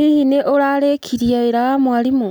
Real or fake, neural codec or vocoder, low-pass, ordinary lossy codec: real; none; none; none